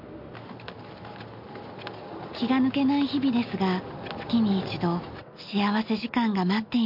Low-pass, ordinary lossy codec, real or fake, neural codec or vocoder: 5.4 kHz; MP3, 48 kbps; real; none